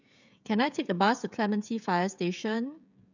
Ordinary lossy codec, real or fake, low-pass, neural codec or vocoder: none; fake; 7.2 kHz; codec, 16 kHz, 16 kbps, FreqCodec, smaller model